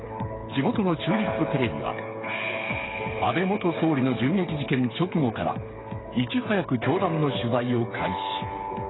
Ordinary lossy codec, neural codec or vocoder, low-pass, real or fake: AAC, 16 kbps; codec, 16 kHz, 8 kbps, FreqCodec, smaller model; 7.2 kHz; fake